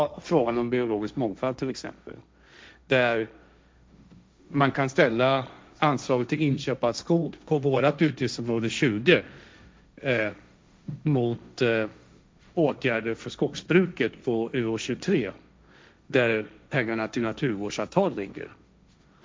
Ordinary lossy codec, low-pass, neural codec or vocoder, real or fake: none; none; codec, 16 kHz, 1.1 kbps, Voila-Tokenizer; fake